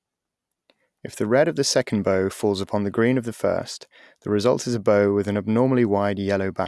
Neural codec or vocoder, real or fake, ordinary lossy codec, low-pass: none; real; none; none